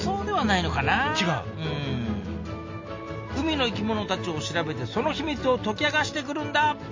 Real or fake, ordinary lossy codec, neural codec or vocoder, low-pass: real; MP3, 32 kbps; none; 7.2 kHz